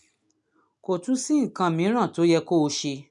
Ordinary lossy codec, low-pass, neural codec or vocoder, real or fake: MP3, 96 kbps; 10.8 kHz; none; real